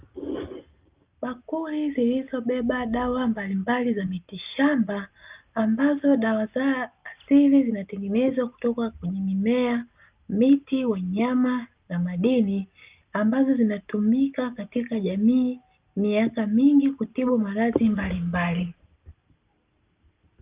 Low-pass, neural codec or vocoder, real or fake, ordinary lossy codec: 3.6 kHz; none; real; Opus, 24 kbps